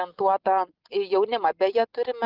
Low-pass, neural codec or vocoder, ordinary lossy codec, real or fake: 5.4 kHz; none; Opus, 24 kbps; real